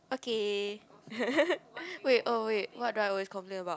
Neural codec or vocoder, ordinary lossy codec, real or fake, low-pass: none; none; real; none